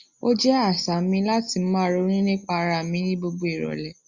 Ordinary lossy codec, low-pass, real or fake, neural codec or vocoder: Opus, 64 kbps; 7.2 kHz; real; none